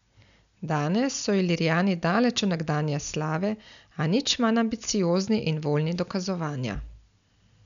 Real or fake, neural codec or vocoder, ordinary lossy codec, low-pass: real; none; none; 7.2 kHz